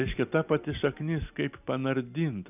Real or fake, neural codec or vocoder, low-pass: real; none; 3.6 kHz